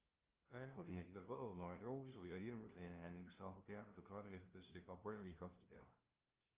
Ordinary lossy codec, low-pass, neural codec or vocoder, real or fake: Opus, 32 kbps; 3.6 kHz; codec, 16 kHz, 0.5 kbps, FunCodec, trained on LibriTTS, 25 frames a second; fake